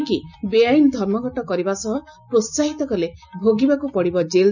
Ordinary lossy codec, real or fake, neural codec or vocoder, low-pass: none; real; none; 7.2 kHz